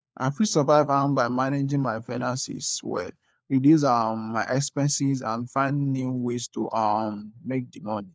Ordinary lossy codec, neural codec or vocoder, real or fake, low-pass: none; codec, 16 kHz, 4 kbps, FunCodec, trained on LibriTTS, 50 frames a second; fake; none